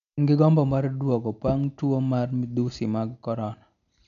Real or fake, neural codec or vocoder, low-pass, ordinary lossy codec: real; none; 7.2 kHz; none